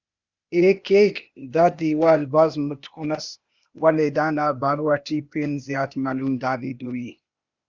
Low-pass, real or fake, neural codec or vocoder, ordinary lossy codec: 7.2 kHz; fake; codec, 16 kHz, 0.8 kbps, ZipCodec; Opus, 64 kbps